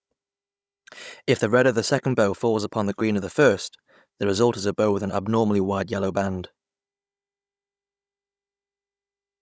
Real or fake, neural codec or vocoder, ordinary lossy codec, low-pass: fake; codec, 16 kHz, 16 kbps, FunCodec, trained on Chinese and English, 50 frames a second; none; none